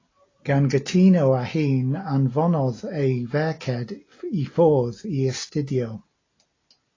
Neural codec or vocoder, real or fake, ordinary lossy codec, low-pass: none; real; AAC, 32 kbps; 7.2 kHz